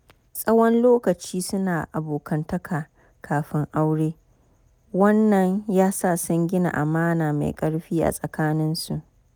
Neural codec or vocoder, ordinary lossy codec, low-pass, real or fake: none; none; none; real